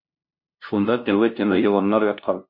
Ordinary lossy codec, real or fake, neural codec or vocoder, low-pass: MP3, 32 kbps; fake; codec, 16 kHz, 0.5 kbps, FunCodec, trained on LibriTTS, 25 frames a second; 5.4 kHz